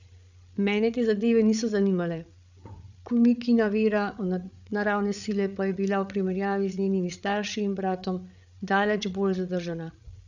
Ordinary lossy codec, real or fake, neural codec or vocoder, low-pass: none; fake; codec, 16 kHz, 8 kbps, FreqCodec, larger model; 7.2 kHz